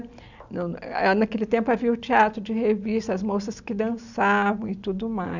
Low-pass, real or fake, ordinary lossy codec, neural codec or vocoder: 7.2 kHz; real; none; none